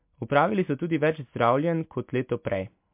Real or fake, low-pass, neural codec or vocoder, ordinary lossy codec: real; 3.6 kHz; none; MP3, 32 kbps